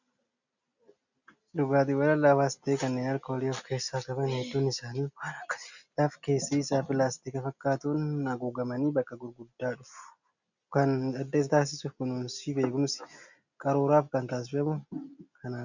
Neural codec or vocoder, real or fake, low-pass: none; real; 7.2 kHz